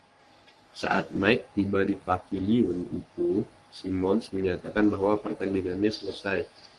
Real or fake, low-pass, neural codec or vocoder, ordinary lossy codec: fake; 10.8 kHz; codec, 44.1 kHz, 3.4 kbps, Pupu-Codec; Opus, 32 kbps